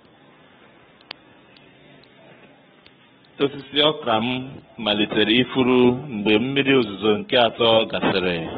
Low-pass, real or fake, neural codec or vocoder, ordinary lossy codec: 19.8 kHz; fake; codec, 44.1 kHz, 7.8 kbps, Pupu-Codec; AAC, 16 kbps